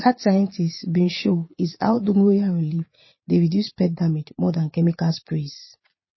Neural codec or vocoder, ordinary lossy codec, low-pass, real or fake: vocoder, 22.05 kHz, 80 mel bands, Vocos; MP3, 24 kbps; 7.2 kHz; fake